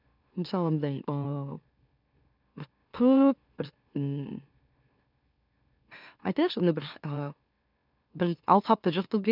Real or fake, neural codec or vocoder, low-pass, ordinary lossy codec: fake; autoencoder, 44.1 kHz, a latent of 192 numbers a frame, MeloTTS; 5.4 kHz; none